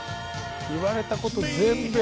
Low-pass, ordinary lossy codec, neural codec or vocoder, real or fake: none; none; none; real